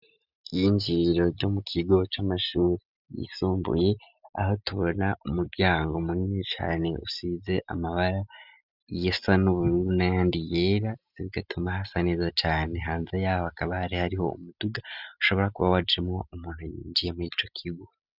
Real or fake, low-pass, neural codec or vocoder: real; 5.4 kHz; none